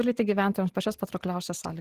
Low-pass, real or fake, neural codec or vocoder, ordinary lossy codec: 14.4 kHz; real; none; Opus, 16 kbps